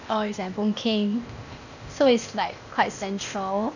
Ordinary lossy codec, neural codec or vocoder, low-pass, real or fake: none; codec, 16 kHz, 0.8 kbps, ZipCodec; 7.2 kHz; fake